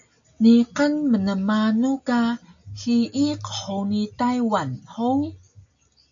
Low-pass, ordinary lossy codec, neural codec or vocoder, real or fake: 7.2 kHz; AAC, 64 kbps; none; real